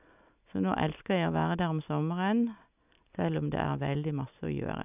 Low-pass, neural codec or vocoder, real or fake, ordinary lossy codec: 3.6 kHz; none; real; none